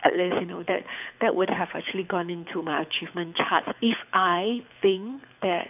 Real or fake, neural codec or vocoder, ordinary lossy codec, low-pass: fake; codec, 24 kHz, 6 kbps, HILCodec; none; 3.6 kHz